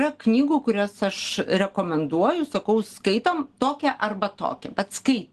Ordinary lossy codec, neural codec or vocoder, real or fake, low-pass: Opus, 16 kbps; none; real; 10.8 kHz